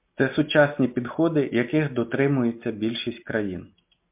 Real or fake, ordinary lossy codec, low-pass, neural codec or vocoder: real; MP3, 32 kbps; 3.6 kHz; none